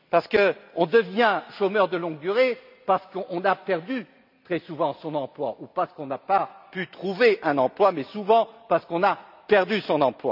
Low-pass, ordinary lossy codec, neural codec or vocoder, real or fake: 5.4 kHz; none; none; real